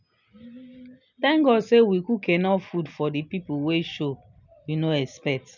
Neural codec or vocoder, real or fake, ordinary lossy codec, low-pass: none; real; none; 7.2 kHz